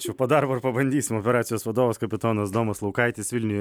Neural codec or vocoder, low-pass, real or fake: none; 19.8 kHz; real